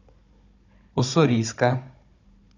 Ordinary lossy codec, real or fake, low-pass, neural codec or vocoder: MP3, 64 kbps; fake; 7.2 kHz; codec, 16 kHz, 16 kbps, FunCodec, trained on Chinese and English, 50 frames a second